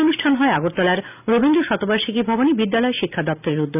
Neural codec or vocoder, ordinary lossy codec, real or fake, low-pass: none; none; real; 3.6 kHz